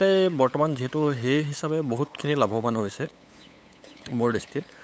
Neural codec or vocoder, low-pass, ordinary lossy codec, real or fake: codec, 16 kHz, 8 kbps, FunCodec, trained on LibriTTS, 25 frames a second; none; none; fake